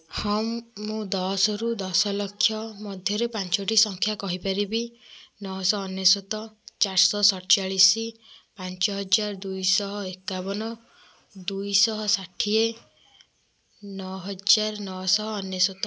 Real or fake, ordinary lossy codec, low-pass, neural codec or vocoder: real; none; none; none